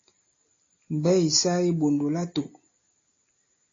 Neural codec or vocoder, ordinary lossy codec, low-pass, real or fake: none; AAC, 32 kbps; 7.2 kHz; real